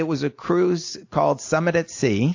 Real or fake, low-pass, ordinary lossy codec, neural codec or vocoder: real; 7.2 kHz; AAC, 48 kbps; none